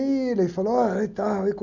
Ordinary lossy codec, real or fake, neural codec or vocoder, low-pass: none; real; none; 7.2 kHz